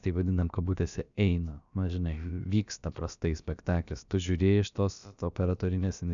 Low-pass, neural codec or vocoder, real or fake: 7.2 kHz; codec, 16 kHz, about 1 kbps, DyCAST, with the encoder's durations; fake